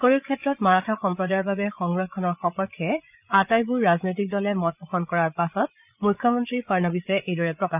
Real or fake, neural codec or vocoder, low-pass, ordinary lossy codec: fake; codec, 16 kHz, 8 kbps, FreqCodec, larger model; 3.6 kHz; none